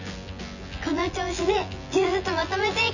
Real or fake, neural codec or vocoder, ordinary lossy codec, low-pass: fake; vocoder, 24 kHz, 100 mel bands, Vocos; none; 7.2 kHz